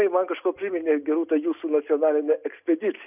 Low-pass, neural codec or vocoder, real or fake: 3.6 kHz; none; real